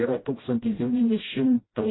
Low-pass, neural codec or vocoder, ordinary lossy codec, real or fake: 7.2 kHz; codec, 16 kHz, 0.5 kbps, FreqCodec, smaller model; AAC, 16 kbps; fake